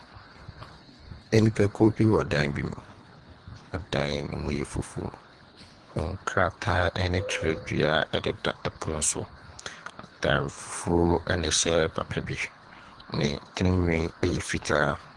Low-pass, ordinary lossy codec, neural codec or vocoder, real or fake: 10.8 kHz; Opus, 24 kbps; codec, 24 kHz, 3 kbps, HILCodec; fake